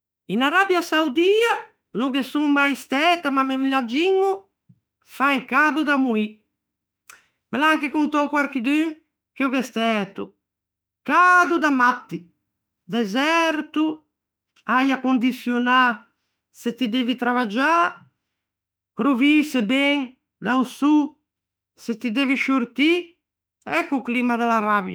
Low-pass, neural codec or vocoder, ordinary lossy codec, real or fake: none; autoencoder, 48 kHz, 32 numbers a frame, DAC-VAE, trained on Japanese speech; none; fake